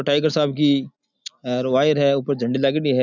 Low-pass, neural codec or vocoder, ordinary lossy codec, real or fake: 7.2 kHz; none; none; real